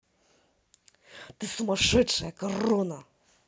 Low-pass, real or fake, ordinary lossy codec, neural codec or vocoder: none; real; none; none